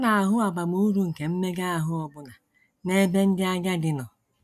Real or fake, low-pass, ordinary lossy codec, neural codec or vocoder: real; 14.4 kHz; none; none